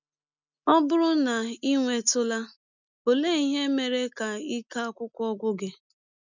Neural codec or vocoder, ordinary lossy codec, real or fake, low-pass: none; none; real; 7.2 kHz